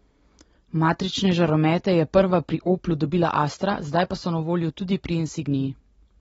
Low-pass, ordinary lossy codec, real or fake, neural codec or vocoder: 19.8 kHz; AAC, 24 kbps; real; none